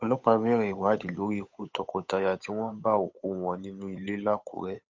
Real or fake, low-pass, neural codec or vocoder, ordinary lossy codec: fake; 7.2 kHz; codec, 16 kHz, 8 kbps, FunCodec, trained on Chinese and English, 25 frames a second; MP3, 48 kbps